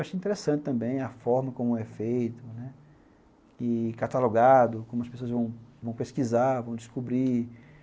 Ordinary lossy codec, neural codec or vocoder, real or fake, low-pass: none; none; real; none